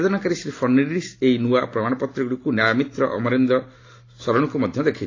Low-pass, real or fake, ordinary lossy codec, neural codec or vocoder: 7.2 kHz; real; AAC, 32 kbps; none